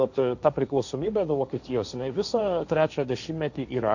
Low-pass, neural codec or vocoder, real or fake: 7.2 kHz; codec, 16 kHz, 1.1 kbps, Voila-Tokenizer; fake